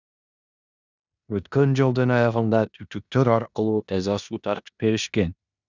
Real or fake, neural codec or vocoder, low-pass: fake; codec, 16 kHz in and 24 kHz out, 0.9 kbps, LongCat-Audio-Codec, four codebook decoder; 7.2 kHz